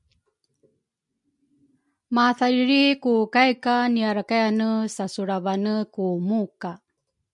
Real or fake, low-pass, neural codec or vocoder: real; 10.8 kHz; none